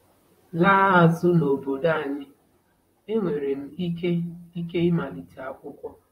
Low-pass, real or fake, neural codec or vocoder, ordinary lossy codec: 19.8 kHz; fake; vocoder, 44.1 kHz, 128 mel bands, Pupu-Vocoder; AAC, 48 kbps